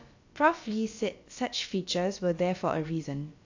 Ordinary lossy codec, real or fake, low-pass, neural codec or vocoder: AAC, 48 kbps; fake; 7.2 kHz; codec, 16 kHz, about 1 kbps, DyCAST, with the encoder's durations